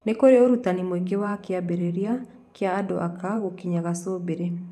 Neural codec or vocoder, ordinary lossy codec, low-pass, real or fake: vocoder, 44.1 kHz, 128 mel bands every 512 samples, BigVGAN v2; none; 14.4 kHz; fake